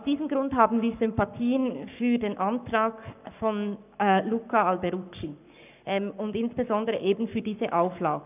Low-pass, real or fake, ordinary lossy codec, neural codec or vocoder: 3.6 kHz; fake; none; codec, 44.1 kHz, 7.8 kbps, Pupu-Codec